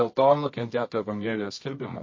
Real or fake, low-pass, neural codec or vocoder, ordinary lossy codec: fake; 7.2 kHz; codec, 24 kHz, 0.9 kbps, WavTokenizer, medium music audio release; MP3, 32 kbps